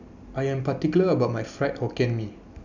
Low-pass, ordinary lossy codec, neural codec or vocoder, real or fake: 7.2 kHz; none; none; real